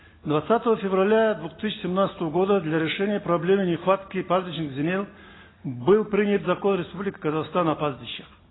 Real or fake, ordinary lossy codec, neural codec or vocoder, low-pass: real; AAC, 16 kbps; none; 7.2 kHz